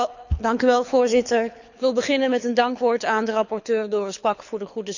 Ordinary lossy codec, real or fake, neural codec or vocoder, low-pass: none; fake; codec, 24 kHz, 6 kbps, HILCodec; 7.2 kHz